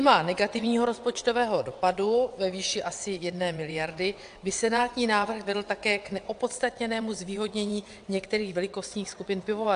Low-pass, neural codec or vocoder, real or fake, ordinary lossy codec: 9.9 kHz; vocoder, 22.05 kHz, 80 mel bands, WaveNeXt; fake; AAC, 64 kbps